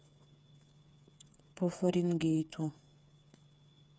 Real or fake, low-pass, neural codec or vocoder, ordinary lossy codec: fake; none; codec, 16 kHz, 8 kbps, FreqCodec, smaller model; none